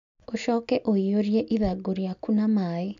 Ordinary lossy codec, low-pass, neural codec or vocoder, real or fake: MP3, 96 kbps; 7.2 kHz; none; real